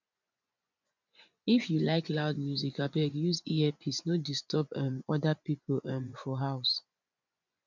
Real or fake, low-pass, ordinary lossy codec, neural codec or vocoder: fake; 7.2 kHz; none; vocoder, 24 kHz, 100 mel bands, Vocos